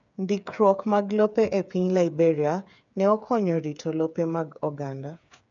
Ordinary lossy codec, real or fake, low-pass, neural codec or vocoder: none; fake; 7.2 kHz; codec, 16 kHz, 8 kbps, FreqCodec, smaller model